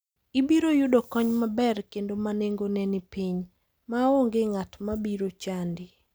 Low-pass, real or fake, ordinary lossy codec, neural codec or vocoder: none; real; none; none